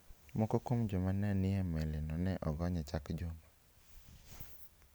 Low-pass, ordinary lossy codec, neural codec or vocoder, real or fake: none; none; none; real